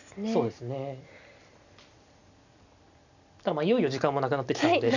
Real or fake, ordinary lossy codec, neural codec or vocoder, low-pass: real; none; none; 7.2 kHz